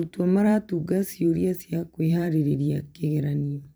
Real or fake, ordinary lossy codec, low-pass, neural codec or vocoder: fake; none; none; vocoder, 44.1 kHz, 128 mel bands every 512 samples, BigVGAN v2